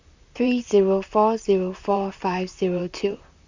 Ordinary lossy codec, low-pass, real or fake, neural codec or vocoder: Opus, 64 kbps; 7.2 kHz; fake; vocoder, 44.1 kHz, 128 mel bands, Pupu-Vocoder